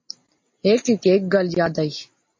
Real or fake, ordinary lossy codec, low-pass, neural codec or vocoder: real; MP3, 32 kbps; 7.2 kHz; none